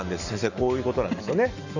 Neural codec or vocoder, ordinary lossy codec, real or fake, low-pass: none; none; real; 7.2 kHz